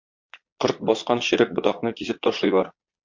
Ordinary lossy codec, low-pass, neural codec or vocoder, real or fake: MP3, 48 kbps; 7.2 kHz; vocoder, 22.05 kHz, 80 mel bands, Vocos; fake